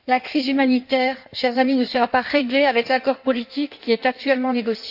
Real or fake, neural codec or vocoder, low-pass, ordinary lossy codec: fake; codec, 16 kHz in and 24 kHz out, 1.1 kbps, FireRedTTS-2 codec; 5.4 kHz; AAC, 48 kbps